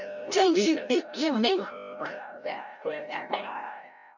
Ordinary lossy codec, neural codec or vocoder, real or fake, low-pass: none; codec, 16 kHz, 0.5 kbps, FreqCodec, larger model; fake; 7.2 kHz